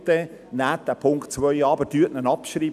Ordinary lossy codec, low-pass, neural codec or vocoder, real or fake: none; 14.4 kHz; none; real